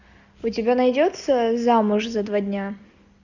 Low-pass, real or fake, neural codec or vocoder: 7.2 kHz; real; none